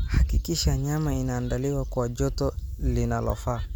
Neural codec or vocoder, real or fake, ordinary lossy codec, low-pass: none; real; none; none